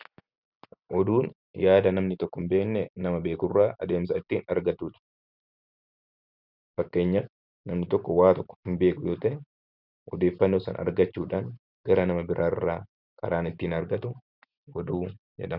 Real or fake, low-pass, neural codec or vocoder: real; 5.4 kHz; none